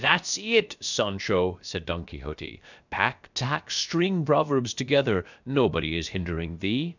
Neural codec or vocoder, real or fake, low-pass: codec, 16 kHz, about 1 kbps, DyCAST, with the encoder's durations; fake; 7.2 kHz